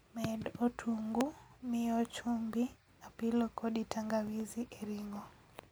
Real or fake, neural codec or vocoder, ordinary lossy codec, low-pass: real; none; none; none